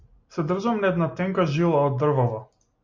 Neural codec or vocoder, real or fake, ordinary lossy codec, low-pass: none; real; MP3, 48 kbps; 7.2 kHz